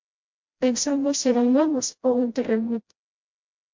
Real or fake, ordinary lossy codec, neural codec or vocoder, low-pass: fake; MP3, 48 kbps; codec, 16 kHz, 0.5 kbps, FreqCodec, smaller model; 7.2 kHz